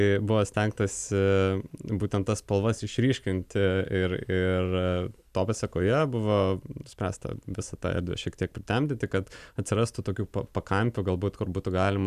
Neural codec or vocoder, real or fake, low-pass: none; real; 14.4 kHz